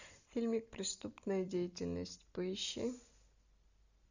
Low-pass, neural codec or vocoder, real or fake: 7.2 kHz; none; real